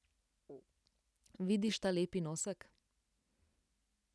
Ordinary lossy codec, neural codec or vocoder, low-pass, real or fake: none; none; none; real